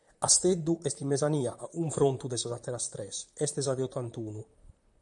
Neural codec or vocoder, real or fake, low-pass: vocoder, 44.1 kHz, 128 mel bands, Pupu-Vocoder; fake; 10.8 kHz